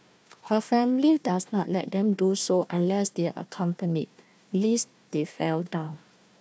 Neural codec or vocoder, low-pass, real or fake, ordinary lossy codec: codec, 16 kHz, 1 kbps, FunCodec, trained on Chinese and English, 50 frames a second; none; fake; none